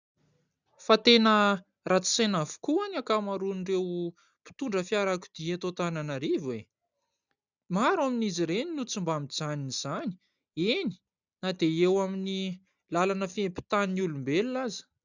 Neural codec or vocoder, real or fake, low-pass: none; real; 7.2 kHz